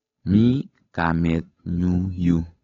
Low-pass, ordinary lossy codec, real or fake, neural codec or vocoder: 7.2 kHz; AAC, 24 kbps; fake; codec, 16 kHz, 8 kbps, FunCodec, trained on Chinese and English, 25 frames a second